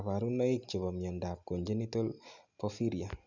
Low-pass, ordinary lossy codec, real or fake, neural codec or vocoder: 7.2 kHz; none; real; none